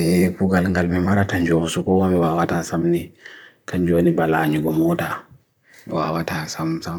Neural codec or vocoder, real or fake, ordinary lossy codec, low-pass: vocoder, 44.1 kHz, 128 mel bands, Pupu-Vocoder; fake; none; none